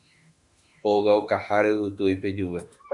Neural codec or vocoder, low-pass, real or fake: autoencoder, 48 kHz, 32 numbers a frame, DAC-VAE, trained on Japanese speech; 10.8 kHz; fake